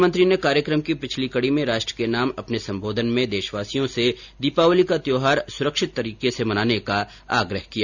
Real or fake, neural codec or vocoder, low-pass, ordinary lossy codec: real; none; 7.2 kHz; none